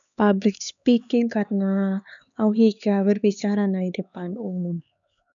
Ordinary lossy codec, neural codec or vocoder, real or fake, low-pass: none; codec, 16 kHz, 4 kbps, X-Codec, HuBERT features, trained on LibriSpeech; fake; 7.2 kHz